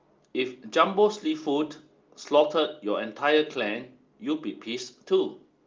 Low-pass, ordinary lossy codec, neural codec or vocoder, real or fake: 7.2 kHz; Opus, 24 kbps; none; real